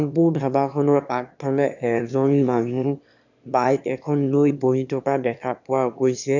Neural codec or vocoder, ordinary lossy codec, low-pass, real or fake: autoencoder, 22.05 kHz, a latent of 192 numbers a frame, VITS, trained on one speaker; none; 7.2 kHz; fake